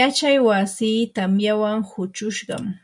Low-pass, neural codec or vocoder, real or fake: 10.8 kHz; none; real